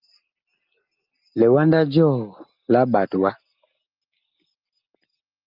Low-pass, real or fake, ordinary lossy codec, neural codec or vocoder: 5.4 kHz; real; Opus, 24 kbps; none